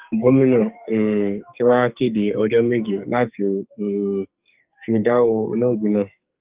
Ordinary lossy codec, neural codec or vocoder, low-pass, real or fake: Opus, 24 kbps; codec, 44.1 kHz, 2.6 kbps, SNAC; 3.6 kHz; fake